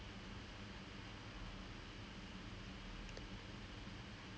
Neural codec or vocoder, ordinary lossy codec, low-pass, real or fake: none; none; none; real